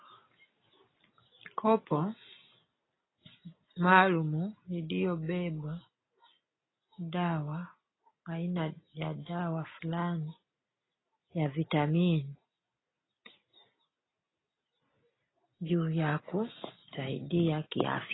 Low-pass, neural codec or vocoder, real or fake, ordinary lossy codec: 7.2 kHz; none; real; AAC, 16 kbps